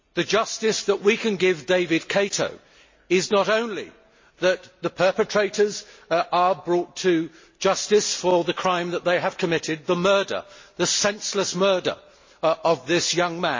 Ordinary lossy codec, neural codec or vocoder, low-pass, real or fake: MP3, 32 kbps; none; 7.2 kHz; real